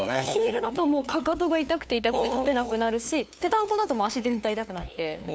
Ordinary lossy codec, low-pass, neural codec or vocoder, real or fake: none; none; codec, 16 kHz, 2 kbps, FunCodec, trained on LibriTTS, 25 frames a second; fake